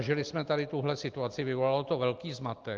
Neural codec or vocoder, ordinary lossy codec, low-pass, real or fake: none; Opus, 32 kbps; 7.2 kHz; real